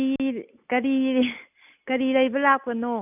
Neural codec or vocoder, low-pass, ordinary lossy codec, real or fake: none; 3.6 kHz; none; real